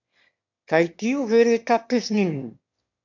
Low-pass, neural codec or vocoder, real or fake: 7.2 kHz; autoencoder, 22.05 kHz, a latent of 192 numbers a frame, VITS, trained on one speaker; fake